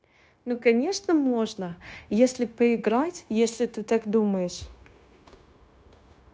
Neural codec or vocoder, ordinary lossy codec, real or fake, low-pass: codec, 16 kHz, 0.9 kbps, LongCat-Audio-Codec; none; fake; none